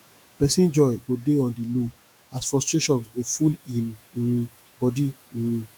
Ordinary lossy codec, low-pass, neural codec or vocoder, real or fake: none; none; autoencoder, 48 kHz, 128 numbers a frame, DAC-VAE, trained on Japanese speech; fake